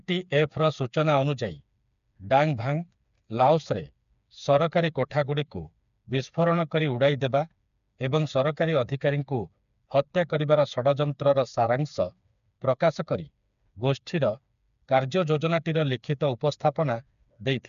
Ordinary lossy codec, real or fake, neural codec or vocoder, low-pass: MP3, 96 kbps; fake; codec, 16 kHz, 4 kbps, FreqCodec, smaller model; 7.2 kHz